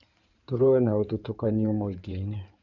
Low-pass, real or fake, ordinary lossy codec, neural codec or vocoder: 7.2 kHz; fake; none; codec, 16 kHz in and 24 kHz out, 2.2 kbps, FireRedTTS-2 codec